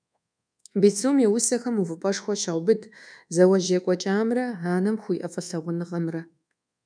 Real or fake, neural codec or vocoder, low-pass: fake; codec, 24 kHz, 1.2 kbps, DualCodec; 9.9 kHz